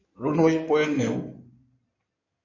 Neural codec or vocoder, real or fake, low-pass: codec, 16 kHz in and 24 kHz out, 2.2 kbps, FireRedTTS-2 codec; fake; 7.2 kHz